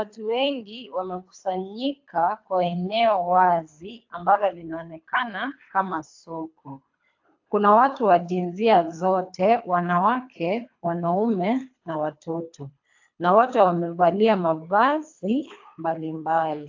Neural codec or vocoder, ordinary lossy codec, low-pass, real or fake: codec, 24 kHz, 3 kbps, HILCodec; AAC, 48 kbps; 7.2 kHz; fake